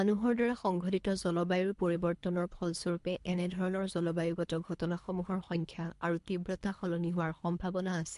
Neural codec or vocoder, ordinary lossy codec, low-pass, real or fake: codec, 24 kHz, 3 kbps, HILCodec; MP3, 64 kbps; 10.8 kHz; fake